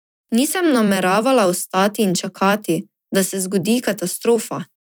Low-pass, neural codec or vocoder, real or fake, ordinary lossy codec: none; vocoder, 44.1 kHz, 128 mel bands every 256 samples, BigVGAN v2; fake; none